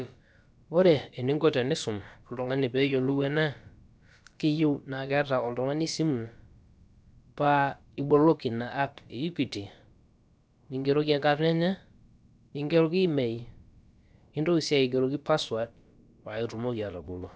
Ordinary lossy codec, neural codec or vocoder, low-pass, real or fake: none; codec, 16 kHz, about 1 kbps, DyCAST, with the encoder's durations; none; fake